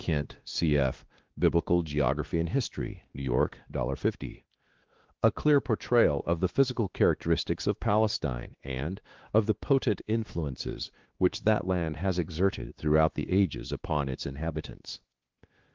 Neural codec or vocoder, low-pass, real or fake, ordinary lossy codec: codec, 16 kHz, 2 kbps, X-Codec, WavLM features, trained on Multilingual LibriSpeech; 7.2 kHz; fake; Opus, 16 kbps